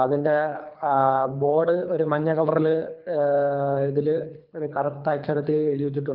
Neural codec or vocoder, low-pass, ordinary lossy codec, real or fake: codec, 24 kHz, 3 kbps, HILCodec; 5.4 kHz; Opus, 24 kbps; fake